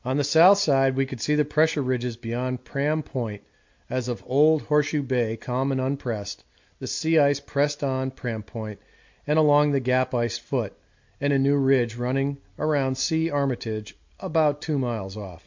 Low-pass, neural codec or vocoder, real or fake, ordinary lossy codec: 7.2 kHz; none; real; MP3, 48 kbps